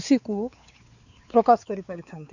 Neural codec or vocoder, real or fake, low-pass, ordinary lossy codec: codec, 16 kHz, 4 kbps, X-Codec, WavLM features, trained on Multilingual LibriSpeech; fake; 7.2 kHz; none